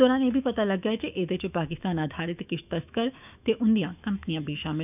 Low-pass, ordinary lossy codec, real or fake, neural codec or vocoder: 3.6 kHz; none; fake; autoencoder, 48 kHz, 128 numbers a frame, DAC-VAE, trained on Japanese speech